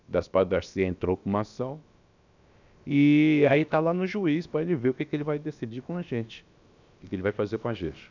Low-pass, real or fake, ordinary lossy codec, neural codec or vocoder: 7.2 kHz; fake; none; codec, 16 kHz, about 1 kbps, DyCAST, with the encoder's durations